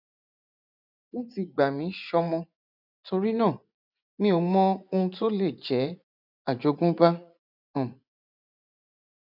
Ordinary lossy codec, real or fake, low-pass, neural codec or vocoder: none; fake; 5.4 kHz; vocoder, 24 kHz, 100 mel bands, Vocos